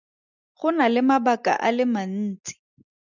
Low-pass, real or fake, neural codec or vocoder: 7.2 kHz; real; none